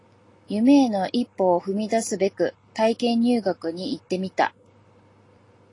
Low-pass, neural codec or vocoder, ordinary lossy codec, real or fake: 10.8 kHz; none; AAC, 32 kbps; real